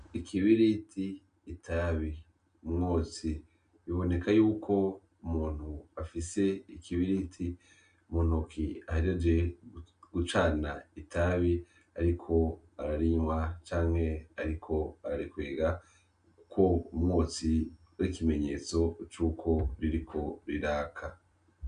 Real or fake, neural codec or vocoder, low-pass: real; none; 9.9 kHz